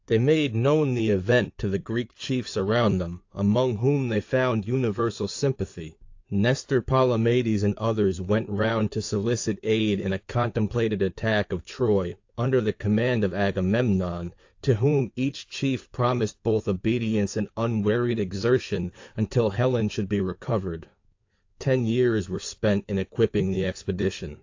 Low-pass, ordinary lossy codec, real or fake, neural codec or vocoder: 7.2 kHz; AAC, 48 kbps; fake; codec, 16 kHz in and 24 kHz out, 2.2 kbps, FireRedTTS-2 codec